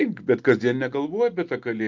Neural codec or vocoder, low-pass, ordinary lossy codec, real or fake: none; 7.2 kHz; Opus, 24 kbps; real